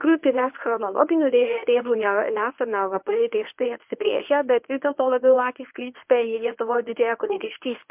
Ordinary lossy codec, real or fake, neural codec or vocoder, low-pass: MP3, 32 kbps; fake; codec, 24 kHz, 0.9 kbps, WavTokenizer, medium speech release version 1; 3.6 kHz